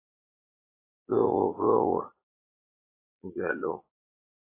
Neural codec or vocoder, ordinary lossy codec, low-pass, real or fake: vocoder, 22.05 kHz, 80 mel bands, Vocos; AAC, 24 kbps; 3.6 kHz; fake